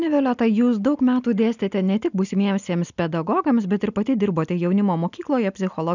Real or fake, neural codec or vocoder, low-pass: real; none; 7.2 kHz